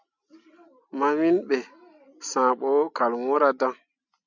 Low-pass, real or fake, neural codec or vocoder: 7.2 kHz; real; none